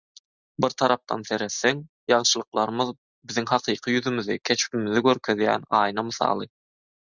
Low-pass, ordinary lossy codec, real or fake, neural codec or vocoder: 7.2 kHz; Opus, 64 kbps; real; none